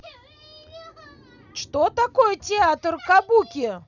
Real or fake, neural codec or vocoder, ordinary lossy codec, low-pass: real; none; none; 7.2 kHz